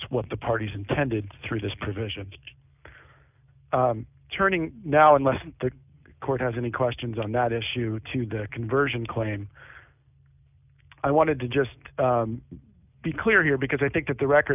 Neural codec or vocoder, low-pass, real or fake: none; 3.6 kHz; real